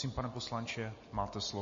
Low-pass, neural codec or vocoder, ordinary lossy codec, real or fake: 7.2 kHz; none; MP3, 32 kbps; real